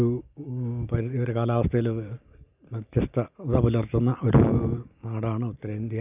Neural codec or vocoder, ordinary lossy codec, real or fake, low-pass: none; none; real; 3.6 kHz